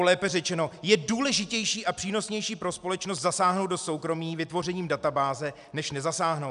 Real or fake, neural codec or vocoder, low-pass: real; none; 10.8 kHz